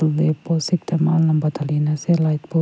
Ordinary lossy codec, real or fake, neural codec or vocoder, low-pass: none; real; none; none